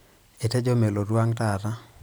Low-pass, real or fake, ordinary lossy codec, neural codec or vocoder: none; fake; none; vocoder, 44.1 kHz, 128 mel bands every 256 samples, BigVGAN v2